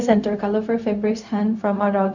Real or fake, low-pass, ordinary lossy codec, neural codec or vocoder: fake; 7.2 kHz; none; codec, 16 kHz, 0.4 kbps, LongCat-Audio-Codec